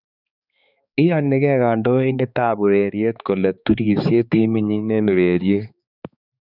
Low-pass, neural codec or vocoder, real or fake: 5.4 kHz; codec, 16 kHz, 4 kbps, X-Codec, HuBERT features, trained on balanced general audio; fake